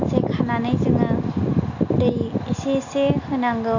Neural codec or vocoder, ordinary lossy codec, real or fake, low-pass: none; none; real; 7.2 kHz